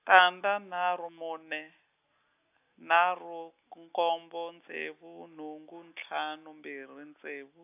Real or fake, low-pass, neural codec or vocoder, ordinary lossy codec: real; 3.6 kHz; none; AAC, 32 kbps